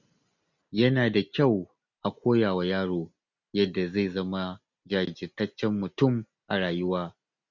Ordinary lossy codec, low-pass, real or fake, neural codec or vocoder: none; 7.2 kHz; real; none